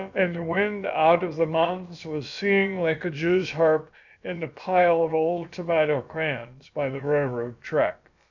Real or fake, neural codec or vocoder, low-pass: fake; codec, 16 kHz, about 1 kbps, DyCAST, with the encoder's durations; 7.2 kHz